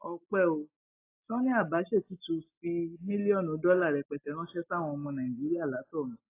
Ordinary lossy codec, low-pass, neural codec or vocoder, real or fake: AAC, 24 kbps; 3.6 kHz; none; real